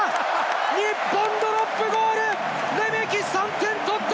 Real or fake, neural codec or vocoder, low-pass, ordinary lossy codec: real; none; none; none